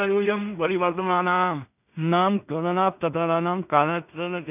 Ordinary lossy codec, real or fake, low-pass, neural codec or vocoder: none; fake; 3.6 kHz; codec, 16 kHz in and 24 kHz out, 0.4 kbps, LongCat-Audio-Codec, two codebook decoder